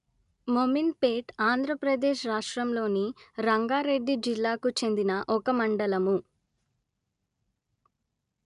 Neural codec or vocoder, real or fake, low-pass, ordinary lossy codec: none; real; 10.8 kHz; none